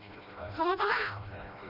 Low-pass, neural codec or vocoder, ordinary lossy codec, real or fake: 5.4 kHz; codec, 16 kHz, 1 kbps, FreqCodec, smaller model; none; fake